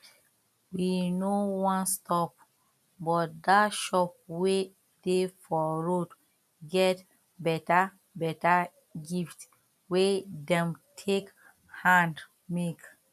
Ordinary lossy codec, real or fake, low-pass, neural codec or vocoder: none; real; 14.4 kHz; none